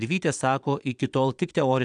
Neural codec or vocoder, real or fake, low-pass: vocoder, 22.05 kHz, 80 mel bands, Vocos; fake; 9.9 kHz